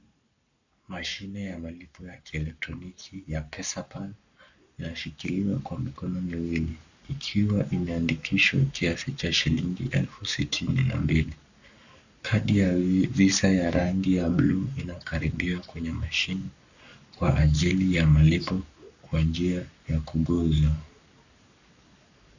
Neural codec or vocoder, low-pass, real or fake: codec, 44.1 kHz, 7.8 kbps, Pupu-Codec; 7.2 kHz; fake